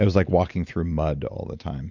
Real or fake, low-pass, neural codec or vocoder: real; 7.2 kHz; none